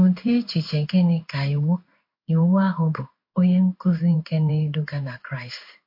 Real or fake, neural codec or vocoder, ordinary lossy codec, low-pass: fake; codec, 16 kHz in and 24 kHz out, 1 kbps, XY-Tokenizer; MP3, 32 kbps; 5.4 kHz